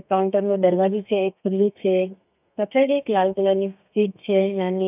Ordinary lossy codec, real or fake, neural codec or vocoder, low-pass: none; fake; codec, 32 kHz, 1.9 kbps, SNAC; 3.6 kHz